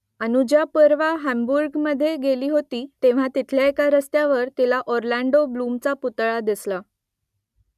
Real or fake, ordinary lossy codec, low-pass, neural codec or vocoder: real; none; 14.4 kHz; none